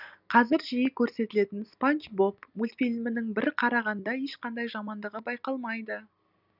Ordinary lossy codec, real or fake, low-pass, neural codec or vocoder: none; real; 5.4 kHz; none